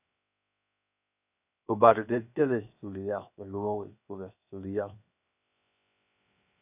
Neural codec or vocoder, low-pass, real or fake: codec, 16 kHz, 0.7 kbps, FocalCodec; 3.6 kHz; fake